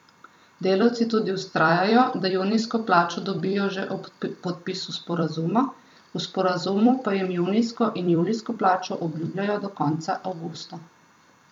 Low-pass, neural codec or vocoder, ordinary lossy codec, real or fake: 19.8 kHz; vocoder, 44.1 kHz, 128 mel bands every 256 samples, BigVGAN v2; none; fake